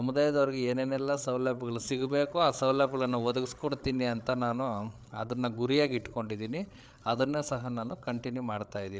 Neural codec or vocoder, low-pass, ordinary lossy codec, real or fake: codec, 16 kHz, 8 kbps, FreqCodec, larger model; none; none; fake